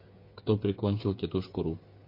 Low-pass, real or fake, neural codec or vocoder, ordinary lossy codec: 5.4 kHz; fake; codec, 16 kHz, 8 kbps, FreqCodec, smaller model; MP3, 32 kbps